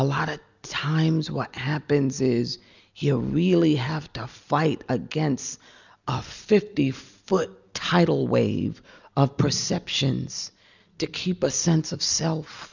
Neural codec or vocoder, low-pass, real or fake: none; 7.2 kHz; real